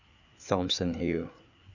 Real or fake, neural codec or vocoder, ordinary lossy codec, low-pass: fake; codec, 16 kHz, 4 kbps, FreqCodec, larger model; none; 7.2 kHz